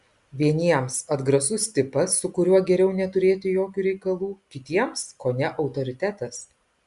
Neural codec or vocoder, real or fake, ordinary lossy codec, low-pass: none; real; Opus, 64 kbps; 10.8 kHz